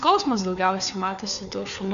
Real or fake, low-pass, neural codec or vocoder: fake; 7.2 kHz; codec, 16 kHz, 4 kbps, FunCodec, trained on LibriTTS, 50 frames a second